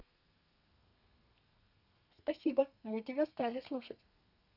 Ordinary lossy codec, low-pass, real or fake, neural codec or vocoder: none; 5.4 kHz; fake; codec, 32 kHz, 1.9 kbps, SNAC